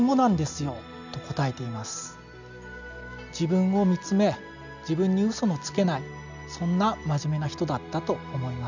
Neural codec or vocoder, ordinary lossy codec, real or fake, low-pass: none; none; real; 7.2 kHz